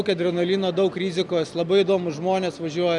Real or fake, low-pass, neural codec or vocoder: real; 10.8 kHz; none